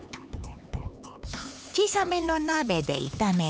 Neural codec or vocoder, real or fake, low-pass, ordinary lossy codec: codec, 16 kHz, 4 kbps, X-Codec, HuBERT features, trained on LibriSpeech; fake; none; none